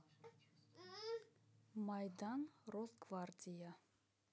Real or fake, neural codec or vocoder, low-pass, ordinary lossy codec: real; none; none; none